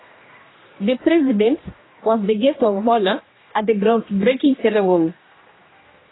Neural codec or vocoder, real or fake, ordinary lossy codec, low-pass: codec, 16 kHz, 1 kbps, X-Codec, HuBERT features, trained on general audio; fake; AAC, 16 kbps; 7.2 kHz